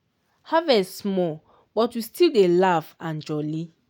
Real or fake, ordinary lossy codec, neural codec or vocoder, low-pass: real; none; none; 19.8 kHz